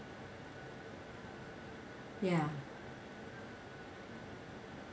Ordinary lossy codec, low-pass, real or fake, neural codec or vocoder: none; none; real; none